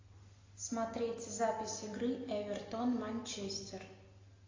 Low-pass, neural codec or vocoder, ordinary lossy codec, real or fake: 7.2 kHz; none; AAC, 32 kbps; real